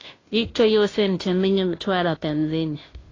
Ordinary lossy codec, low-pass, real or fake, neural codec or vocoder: AAC, 32 kbps; 7.2 kHz; fake; codec, 16 kHz, 0.5 kbps, FunCodec, trained on Chinese and English, 25 frames a second